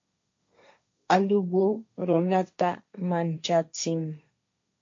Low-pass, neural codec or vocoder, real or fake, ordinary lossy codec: 7.2 kHz; codec, 16 kHz, 1.1 kbps, Voila-Tokenizer; fake; MP3, 48 kbps